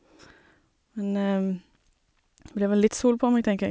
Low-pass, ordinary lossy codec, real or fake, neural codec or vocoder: none; none; real; none